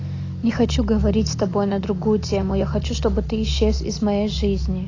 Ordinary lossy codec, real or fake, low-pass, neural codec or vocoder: AAC, 32 kbps; real; 7.2 kHz; none